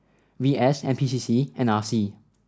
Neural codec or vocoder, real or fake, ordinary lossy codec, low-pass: none; real; none; none